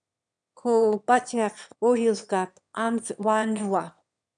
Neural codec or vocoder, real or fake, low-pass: autoencoder, 22.05 kHz, a latent of 192 numbers a frame, VITS, trained on one speaker; fake; 9.9 kHz